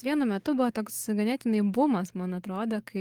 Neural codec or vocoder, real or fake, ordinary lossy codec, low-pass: codec, 44.1 kHz, 7.8 kbps, DAC; fake; Opus, 24 kbps; 19.8 kHz